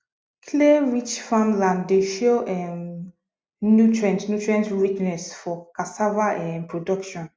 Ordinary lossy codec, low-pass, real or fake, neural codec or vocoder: none; none; real; none